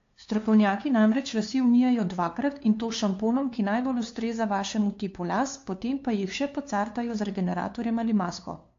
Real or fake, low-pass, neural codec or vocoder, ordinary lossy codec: fake; 7.2 kHz; codec, 16 kHz, 2 kbps, FunCodec, trained on LibriTTS, 25 frames a second; AAC, 48 kbps